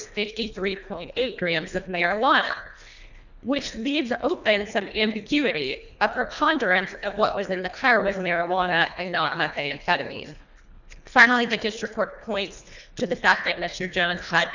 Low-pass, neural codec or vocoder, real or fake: 7.2 kHz; codec, 24 kHz, 1.5 kbps, HILCodec; fake